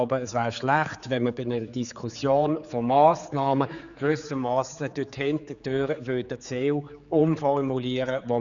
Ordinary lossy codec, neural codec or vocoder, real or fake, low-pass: none; codec, 16 kHz, 4 kbps, X-Codec, HuBERT features, trained on general audio; fake; 7.2 kHz